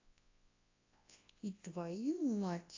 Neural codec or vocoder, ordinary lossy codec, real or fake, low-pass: codec, 24 kHz, 0.9 kbps, WavTokenizer, large speech release; AAC, 32 kbps; fake; 7.2 kHz